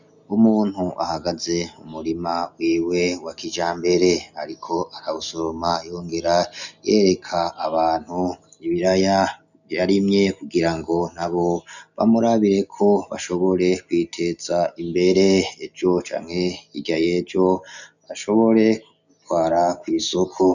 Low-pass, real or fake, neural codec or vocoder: 7.2 kHz; real; none